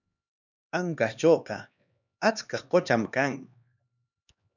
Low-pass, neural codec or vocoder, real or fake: 7.2 kHz; codec, 16 kHz, 2 kbps, X-Codec, HuBERT features, trained on LibriSpeech; fake